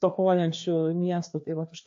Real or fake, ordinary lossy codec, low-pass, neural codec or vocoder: fake; AAC, 48 kbps; 7.2 kHz; codec, 16 kHz, 2 kbps, FreqCodec, larger model